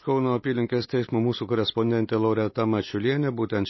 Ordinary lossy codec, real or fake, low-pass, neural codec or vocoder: MP3, 24 kbps; real; 7.2 kHz; none